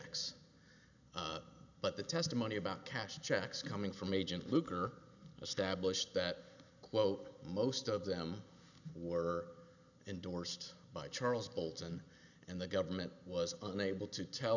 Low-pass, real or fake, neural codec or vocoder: 7.2 kHz; real; none